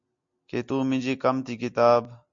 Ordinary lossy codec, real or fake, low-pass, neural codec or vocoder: MP3, 48 kbps; real; 7.2 kHz; none